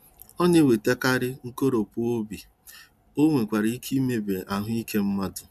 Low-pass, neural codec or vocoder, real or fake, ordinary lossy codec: 14.4 kHz; none; real; none